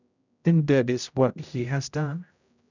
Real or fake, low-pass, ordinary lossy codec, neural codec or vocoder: fake; 7.2 kHz; none; codec, 16 kHz, 0.5 kbps, X-Codec, HuBERT features, trained on general audio